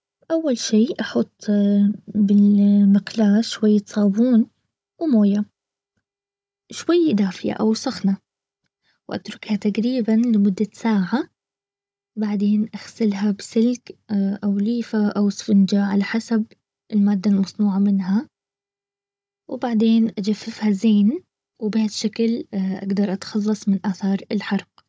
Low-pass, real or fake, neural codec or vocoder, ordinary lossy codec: none; fake; codec, 16 kHz, 16 kbps, FunCodec, trained on Chinese and English, 50 frames a second; none